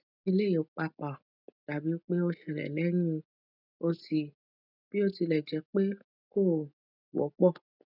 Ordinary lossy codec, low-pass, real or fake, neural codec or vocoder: none; 5.4 kHz; real; none